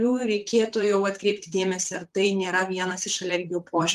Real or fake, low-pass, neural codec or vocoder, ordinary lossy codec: fake; 14.4 kHz; vocoder, 44.1 kHz, 128 mel bands, Pupu-Vocoder; Opus, 24 kbps